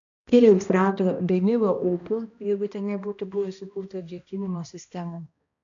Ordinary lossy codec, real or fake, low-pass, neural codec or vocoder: MP3, 96 kbps; fake; 7.2 kHz; codec, 16 kHz, 1 kbps, X-Codec, HuBERT features, trained on balanced general audio